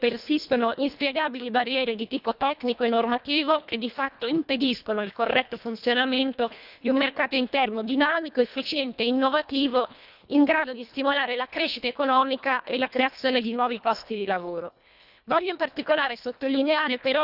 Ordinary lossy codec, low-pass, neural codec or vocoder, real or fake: none; 5.4 kHz; codec, 24 kHz, 1.5 kbps, HILCodec; fake